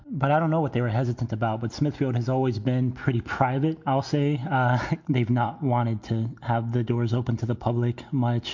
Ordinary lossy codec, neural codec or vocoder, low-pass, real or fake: MP3, 48 kbps; none; 7.2 kHz; real